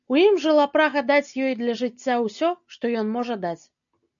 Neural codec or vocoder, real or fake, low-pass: none; real; 7.2 kHz